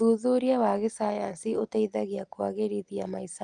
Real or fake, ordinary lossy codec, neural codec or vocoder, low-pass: real; Opus, 32 kbps; none; 9.9 kHz